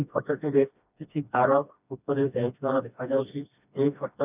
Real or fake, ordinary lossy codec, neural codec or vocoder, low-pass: fake; none; codec, 16 kHz, 1 kbps, FreqCodec, smaller model; 3.6 kHz